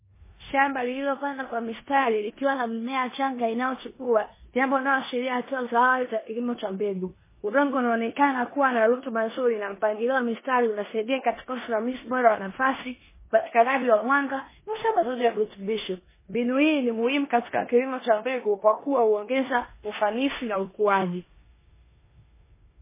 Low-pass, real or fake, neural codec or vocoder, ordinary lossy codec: 3.6 kHz; fake; codec, 16 kHz in and 24 kHz out, 0.9 kbps, LongCat-Audio-Codec, four codebook decoder; MP3, 16 kbps